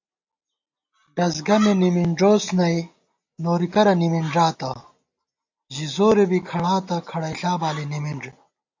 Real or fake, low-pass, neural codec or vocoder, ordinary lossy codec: real; 7.2 kHz; none; AAC, 48 kbps